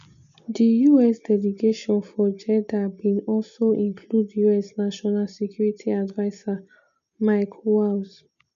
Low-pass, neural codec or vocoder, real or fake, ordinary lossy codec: 7.2 kHz; none; real; none